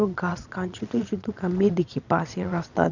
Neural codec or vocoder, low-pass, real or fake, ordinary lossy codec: vocoder, 22.05 kHz, 80 mel bands, Vocos; 7.2 kHz; fake; none